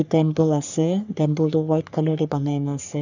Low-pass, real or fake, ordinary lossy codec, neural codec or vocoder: 7.2 kHz; fake; none; codec, 44.1 kHz, 3.4 kbps, Pupu-Codec